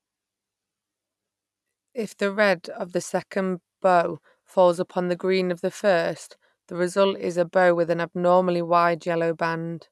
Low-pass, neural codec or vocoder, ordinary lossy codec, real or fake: none; none; none; real